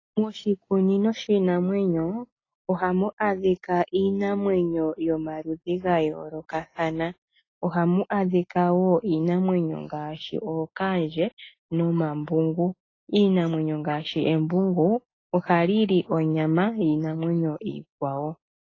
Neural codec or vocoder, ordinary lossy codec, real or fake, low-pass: none; AAC, 32 kbps; real; 7.2 kHz